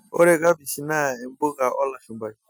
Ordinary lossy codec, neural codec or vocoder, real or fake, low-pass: none; none; real; none